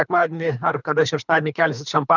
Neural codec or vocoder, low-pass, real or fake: codec, 24 kHz, 3 kbps, HILCodec; 7.2 kHz; fake